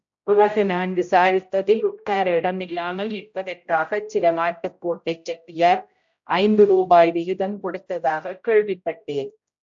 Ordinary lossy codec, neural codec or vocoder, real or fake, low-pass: AAC, 64 kbps; codec, 16 kHz, 0.5 kbps, X-Codec, HuBERT features, trained on general audio; fake; 7.2 kHz